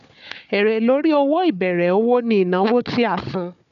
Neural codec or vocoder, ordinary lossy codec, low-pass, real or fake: codec, 16 kHz, 4 kbps, FunCodec, trained on Chinese and English, 50 frames a second; none; 7.2 kHz; fake